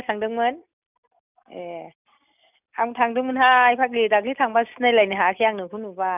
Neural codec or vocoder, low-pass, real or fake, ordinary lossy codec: none; 3.6 kHz; real; none